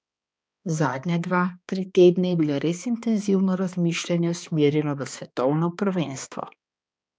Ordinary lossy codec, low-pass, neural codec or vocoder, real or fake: none; none; codec, 16 kHz, 2 kbps, X-Codec, HuBERT features, trained on balanced general audio; fake